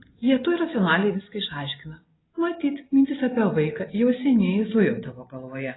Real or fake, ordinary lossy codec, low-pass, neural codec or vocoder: real; AAC, 16 kbps; 7.2 kHz; none